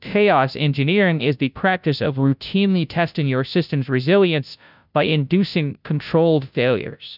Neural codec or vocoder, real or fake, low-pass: codec, 16 kHz, 0.5 kbps, FunCodec, trained on Chinese and English, 25 frames a second; fake; 5.4 kHz